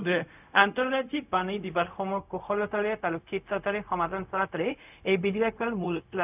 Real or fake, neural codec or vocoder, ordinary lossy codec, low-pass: fake; codec, 16 kHz, 0.4 kbps, LongCat-Audio-Codec; none; 3.6 kHz